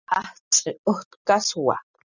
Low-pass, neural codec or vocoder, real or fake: 7.2 kHz; none; real